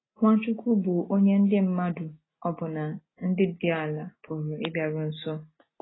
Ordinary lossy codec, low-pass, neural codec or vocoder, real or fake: AAC, 16 kbps; 7.2 kHz; none; real